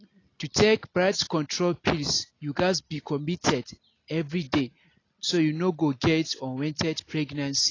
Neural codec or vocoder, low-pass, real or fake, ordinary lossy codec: none; 7.2 kHz; real; AAC, 32 kbps